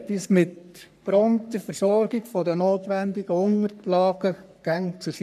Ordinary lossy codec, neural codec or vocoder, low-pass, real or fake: none; codec, 44.1 kHz, 3.4 kbps, Pupu-Codec; 14.4 kHz; fake